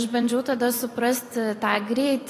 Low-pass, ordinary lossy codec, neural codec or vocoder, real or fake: 14.4 kHz; AAC, 48 kbps; vocoder, 44.1 kHz, 128 mel bands every 256 samples, BigVGAN v2; fake